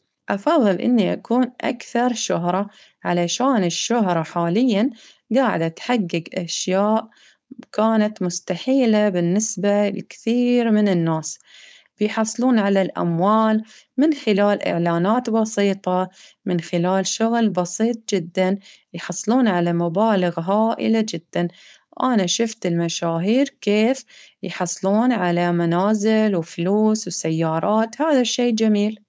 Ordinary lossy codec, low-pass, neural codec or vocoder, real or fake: none; none; codec, 16 kHz, 4.8 kbps, FACodec; fake